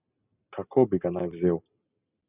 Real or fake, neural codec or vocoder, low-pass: real; none; 3.6 kHz